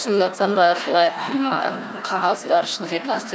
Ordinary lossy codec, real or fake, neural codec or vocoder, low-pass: none; fake; codec, 16 kHz, 1 kbps, FunCodec, trained on Chinese and English, 50 frames a second; none